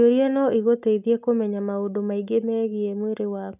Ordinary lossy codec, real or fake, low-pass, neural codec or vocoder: none; real; 3.6 kHz; none